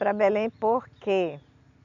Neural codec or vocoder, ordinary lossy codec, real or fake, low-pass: none; none; real; 7.2 kHz